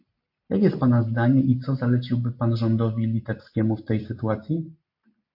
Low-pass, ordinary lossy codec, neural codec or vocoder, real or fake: 5.4 kHz; MP3, 32 kbps; none; real